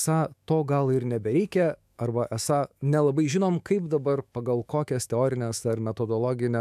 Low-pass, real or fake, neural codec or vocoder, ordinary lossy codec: 14.4 kHz; fake; autoencoder, 48 kHz, 128 numbers a frame, DAC-VAE, trained on Japanese speech; AAC, 96 kbps